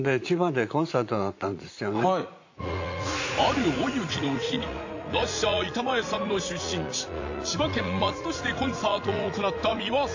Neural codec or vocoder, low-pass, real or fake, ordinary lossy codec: vocoder, 44.1 kHz, 80 mel bands, Vocos; 7.2 kHz; fake; AAC, 48 kbps